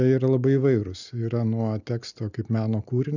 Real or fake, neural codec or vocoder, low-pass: real; none; 7.2 kHz